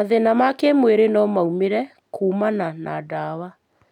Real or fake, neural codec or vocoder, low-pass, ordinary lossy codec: real; none; 19.8 kHz; none